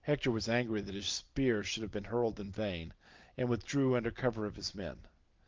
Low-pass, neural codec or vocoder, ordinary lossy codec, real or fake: 7.2 kHz; none; Opus, 32 kbps; real